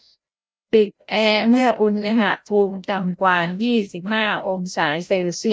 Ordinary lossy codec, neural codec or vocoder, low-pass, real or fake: none; codec, 16 kHz, 0.5 kbps, FreqCodec, larger model; none; fake